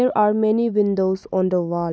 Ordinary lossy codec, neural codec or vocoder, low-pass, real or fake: none; none; none; real